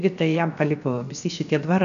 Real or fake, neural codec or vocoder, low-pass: fake; codec, 16 kHz, 0.7 kbps, FocalCodec; 7.2 kHz